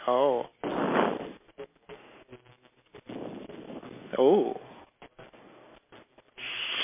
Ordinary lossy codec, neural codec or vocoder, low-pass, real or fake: MP3, 24 kbps; none; 3.6 kHz; real